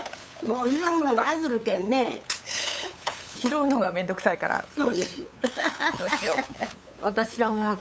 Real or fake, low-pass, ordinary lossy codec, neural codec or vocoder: fake; none; none; codec, 16 kHz, 8 kbps, FunCodec, trained on LibriTTS, 25 frames a second